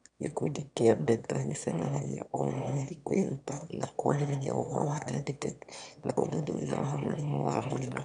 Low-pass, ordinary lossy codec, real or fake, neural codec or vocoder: 9.9 kHz; none; fake; autoencoder, 22.05 kHz, a latent of 192 numbers a frame, VITS, trained on one speaker